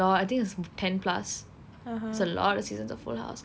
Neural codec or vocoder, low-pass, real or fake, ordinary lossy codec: none; none; real; none